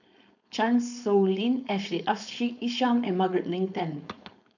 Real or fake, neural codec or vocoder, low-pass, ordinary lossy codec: fake; codec, 16 kHz, 4.8 kbps, FACodec; 7.2 kHz; none